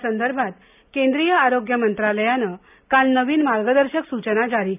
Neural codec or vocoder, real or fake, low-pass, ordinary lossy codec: none; real; 3.6 kHz; none